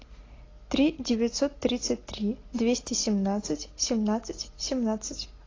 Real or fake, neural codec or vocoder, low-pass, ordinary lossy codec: real; none; 7.2 kHz; AAC, 32 kbps